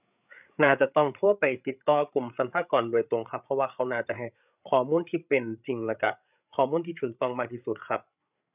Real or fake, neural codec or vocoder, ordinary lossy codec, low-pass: fake; codec, 16 kHz, 8 kbps, FreqCodec, larger model; none; 3.6 kHz